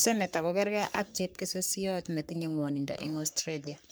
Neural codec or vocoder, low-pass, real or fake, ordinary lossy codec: codec, 44.1 kHz, 3.4 kbps, Pupu-Codec; none; fake; none